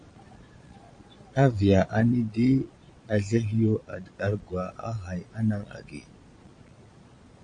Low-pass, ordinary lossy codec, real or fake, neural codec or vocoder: 9.9 kHz; MP3, 48 kbps; fake; vocoder, 22.05 kHz, 80 mel bands, Vocos